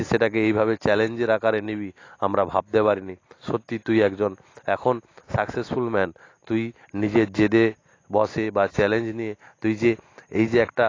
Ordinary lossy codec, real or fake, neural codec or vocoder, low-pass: AAC, 32 kbps; real; none; 7.2 kHz